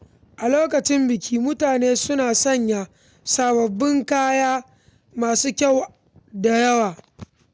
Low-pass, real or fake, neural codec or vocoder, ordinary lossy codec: none; real; none; none